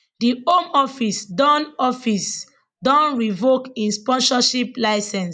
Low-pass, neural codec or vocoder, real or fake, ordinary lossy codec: 9.9 kHz; none; real; none